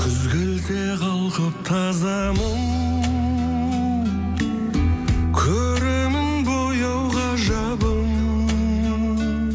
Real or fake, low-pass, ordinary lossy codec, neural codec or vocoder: real; none; none; none